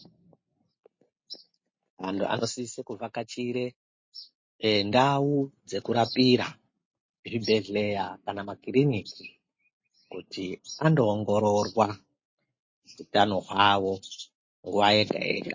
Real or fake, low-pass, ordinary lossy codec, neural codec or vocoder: real; 7.2 kHz; MP3, 32 kbps; none